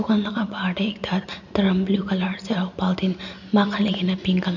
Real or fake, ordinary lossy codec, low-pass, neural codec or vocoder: real; none; 7.2 kHz; none